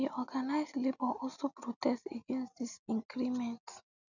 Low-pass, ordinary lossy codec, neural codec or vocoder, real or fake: 7.2 kHz; none; none; real